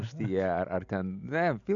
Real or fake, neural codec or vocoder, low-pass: real; none; 7.2 kHz